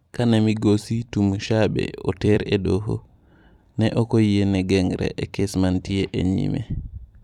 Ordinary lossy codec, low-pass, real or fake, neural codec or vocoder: none; 19.8 kHz; fake; vocoder, 44.1 kHz, 128 mel bands every 256 samples, BigVGAN v2